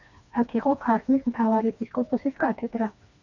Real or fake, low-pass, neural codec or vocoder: fake; 7.2 kHz; codec, 16 kHz, 2 kbps, FreqCodec, smaller model